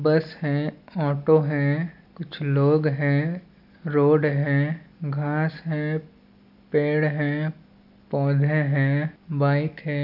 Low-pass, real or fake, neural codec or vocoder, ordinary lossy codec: 5.4 kHz; real; none; none